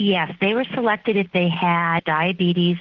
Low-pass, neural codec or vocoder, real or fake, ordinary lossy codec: 7.2 kHz; none; real; Opus, 16 kbps